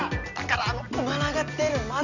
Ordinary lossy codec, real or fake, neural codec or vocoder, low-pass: none; real; none; 7.2 kHz